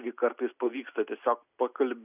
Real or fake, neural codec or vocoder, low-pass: real; none; 3.6 kHz